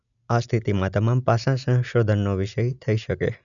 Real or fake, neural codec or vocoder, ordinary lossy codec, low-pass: real; none; none; 7.2 kHz